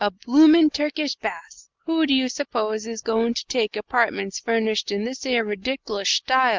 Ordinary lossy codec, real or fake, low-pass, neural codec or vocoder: Opus, 32 kbps; real; 7.2 kHz; none